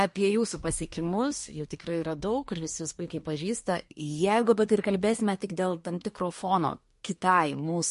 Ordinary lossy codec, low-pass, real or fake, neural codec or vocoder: MP3, 48 kbps; 10.8 kHz; fake; codec, 24 kHz, 1 kbps, SNAC